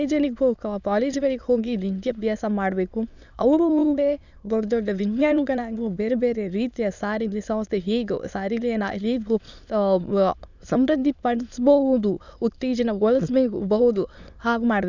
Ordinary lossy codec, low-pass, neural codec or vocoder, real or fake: none; 7.2 kHz; autoencoder, 22.05 kHz, a latent of 192 numbers a frame, VITS, trained on many speakers; fake